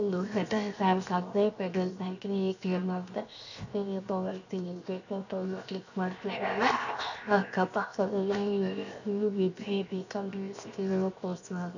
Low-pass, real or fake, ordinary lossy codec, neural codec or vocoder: 7.2 kHz; fake; none; codec, 16 kHz, 0.7 kbps, FocalCodec